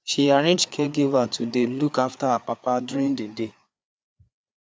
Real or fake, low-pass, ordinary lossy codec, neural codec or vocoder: fake; none; none; codec, 16 kHz, 4 kbps, FreqCodec, larger model